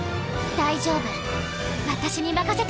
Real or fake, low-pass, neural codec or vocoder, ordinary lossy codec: real; none; none; none